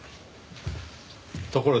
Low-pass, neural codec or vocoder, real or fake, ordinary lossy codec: none; none; real; none